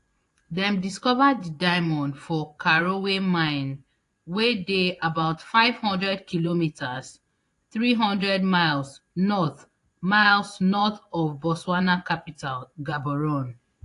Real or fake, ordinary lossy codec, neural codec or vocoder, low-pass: fake; AAC, 48 kbps; vocoder, 24 kHz, 100 mel bands, Vocos; 10.8 kHz